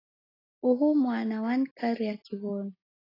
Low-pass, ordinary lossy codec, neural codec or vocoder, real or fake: 5.4 kHz; AAC, 24 kbps; none; real